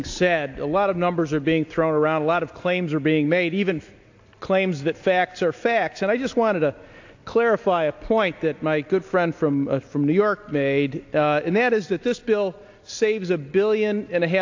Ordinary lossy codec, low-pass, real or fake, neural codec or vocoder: AAC, 48 kbps; 7.2 kHz; real; none